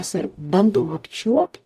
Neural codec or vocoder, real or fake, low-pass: codec, 44.1 kHz, 0.9 kbps, DAC; fake; 14.4 kHz